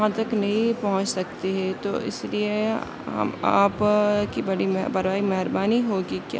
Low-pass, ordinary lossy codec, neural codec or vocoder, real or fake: none; none; none; real